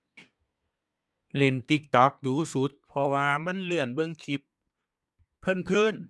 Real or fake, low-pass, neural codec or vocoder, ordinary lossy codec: fake; none; codec, 24 kHz, 1 kbps, SNAC; none